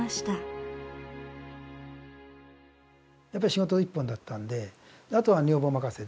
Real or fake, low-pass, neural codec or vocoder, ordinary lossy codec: real; none; none; none